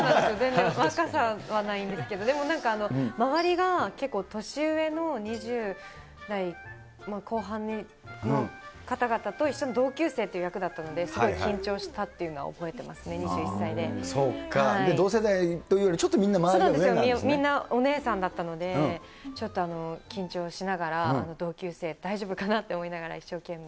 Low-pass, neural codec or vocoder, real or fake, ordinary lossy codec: none; none; real; none